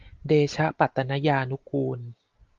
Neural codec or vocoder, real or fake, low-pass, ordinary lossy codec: none; real; 7.2 kHz; Opus, 32 kbps